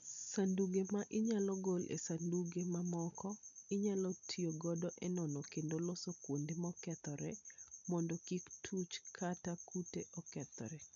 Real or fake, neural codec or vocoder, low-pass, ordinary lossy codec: real; none; 7.2 kHz; none